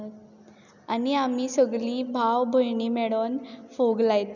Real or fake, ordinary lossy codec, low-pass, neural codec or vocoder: real; none; 7.2 kHz; none